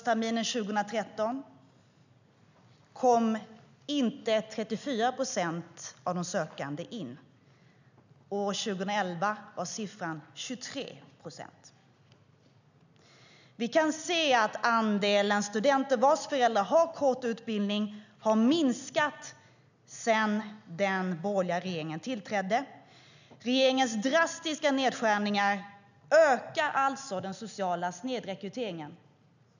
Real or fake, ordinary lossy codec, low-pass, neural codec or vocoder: real; none; 7.2 kHz; none